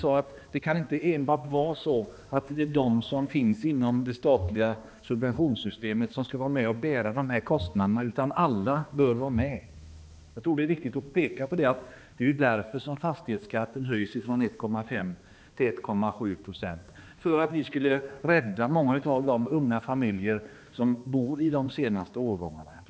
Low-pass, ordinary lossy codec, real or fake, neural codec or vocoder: none; none; fake; codec, 16 kHz, 2 kbps, X-Codec, HuBERT features, trained on balanced general audio